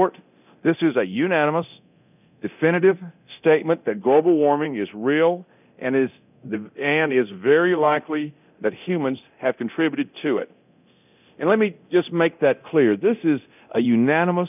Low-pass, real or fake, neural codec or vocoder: 3.6 kHz; fake; codec, 24 kHz, 0.9 kbps, DualCodec